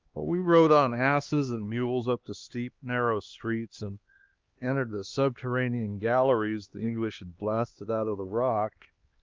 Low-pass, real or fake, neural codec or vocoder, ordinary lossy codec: 7.2 kHz; fake; codec, 16 kHz, 2 kbps, X-Codec, HuBERT features, trained on LibriSpeech; Opus, 32 kbps